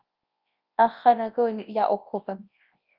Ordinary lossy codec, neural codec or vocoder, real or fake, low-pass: Opus, 32 kbps; codec, 24 kHz, 0.9 kbps, WavTokenizer, large speech release; fake; 5.4 kHz